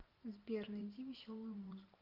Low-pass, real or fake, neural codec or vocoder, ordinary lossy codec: 5.4 kHz; fake; vocoder, 44.1 kHz, 128 mel bands every 512 samples, BigVGAN v2; Opus, 24 kbps